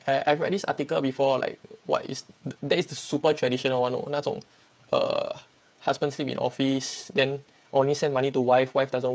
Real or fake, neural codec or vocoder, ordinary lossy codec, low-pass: fake; codec, 16 kHz, 8 kbps, FreqCodec, smaller model; none; none